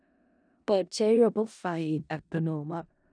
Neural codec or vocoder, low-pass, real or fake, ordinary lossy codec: codec, 16 kHz in and 24 kHz out, 0.4 kbps, LongCat-Audio-Codec, four codebook decoder; 9.9 kHz; fake; none